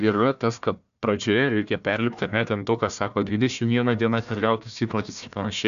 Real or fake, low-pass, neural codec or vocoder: fake; 7.2 kHz; codec, 16 kHz, 1 kbps, FunCodec, trained on Chinese and English, 50 frames a second